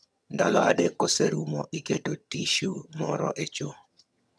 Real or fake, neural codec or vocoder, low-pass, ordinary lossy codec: fake; vocoder, 22.05 kHz, 80 mel bands, HiFi-GAN; none; none